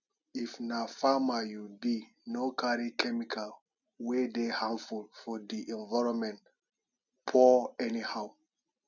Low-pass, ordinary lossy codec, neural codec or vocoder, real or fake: 7.2 kHz; none; none; real